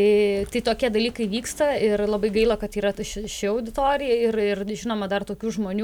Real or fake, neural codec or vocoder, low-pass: real; none; 19.8 kHz